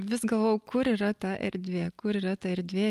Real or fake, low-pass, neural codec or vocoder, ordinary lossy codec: real; 14.4 kHz; none; Opus, 32 kbps